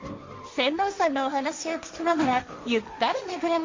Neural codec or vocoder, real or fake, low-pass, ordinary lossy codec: codec, 16 kHz, 1.1 kbps, Voila-Tokenizer; fake; 7.2 kHz; MP3, 48 kbps